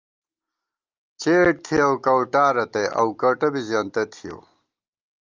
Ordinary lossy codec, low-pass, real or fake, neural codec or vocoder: Opus, 24 kbps; 7.2 kHz; real; none